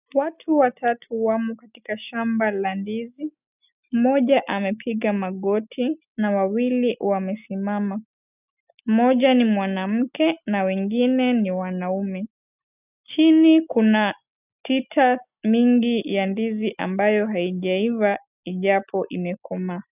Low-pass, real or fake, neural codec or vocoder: 3.6 kHz; real; none